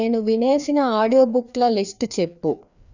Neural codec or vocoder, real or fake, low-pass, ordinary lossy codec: codec, 16 kHz, 2 kbps, FreqCodec, larger model; fake; 7.2 kHz; none